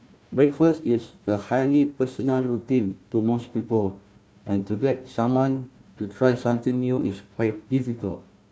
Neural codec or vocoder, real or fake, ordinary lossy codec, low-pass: codec, 16 kHz, 1 kbps, FunCodec, trained on Chinese and English, 50 frames a second; fake; none; none